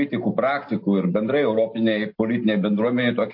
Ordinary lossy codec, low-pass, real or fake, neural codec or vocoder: MP3, 32 kbps; 5.4 kHz; real; none